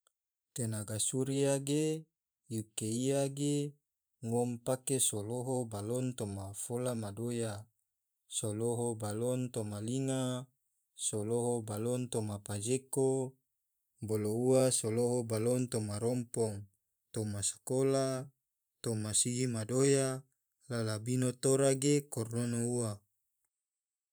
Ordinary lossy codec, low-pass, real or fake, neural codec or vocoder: none; none; fake; vocoder, 44.1 kHz, 128 mel bands every 512 samples, BigVGAN v2